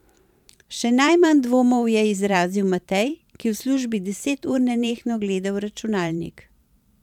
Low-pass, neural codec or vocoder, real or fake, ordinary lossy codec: 19.8 kHz; vocoder, 44.1 kHz, 128 mel bands every 512 samples, BigVGAN v2; fake; none